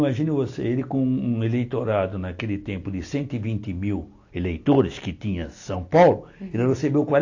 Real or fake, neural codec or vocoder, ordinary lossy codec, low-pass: real; none; AAC, 48 kbps; 7.2 kHz